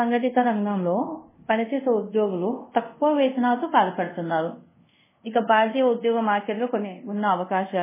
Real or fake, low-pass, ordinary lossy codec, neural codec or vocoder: fake; 3.6 kHz; MP3, 16 kbps; codec, 24 kHz, 0.5 kbps, DualCodec